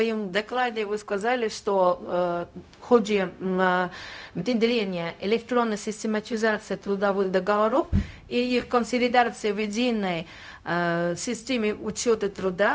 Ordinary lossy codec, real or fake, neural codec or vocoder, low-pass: none; fake; codec, 16 kHz, 0.4 kbps, LongCat-Audio-Codec; none